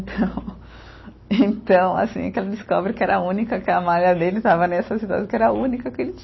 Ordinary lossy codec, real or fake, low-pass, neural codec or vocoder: MP3, 24 kbps; real; 7.2 kHz; none